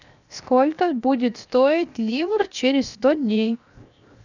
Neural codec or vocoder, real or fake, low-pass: codec, 16 kHz, 0.7 kbps, FocalCodec; fake; 7.2 kHz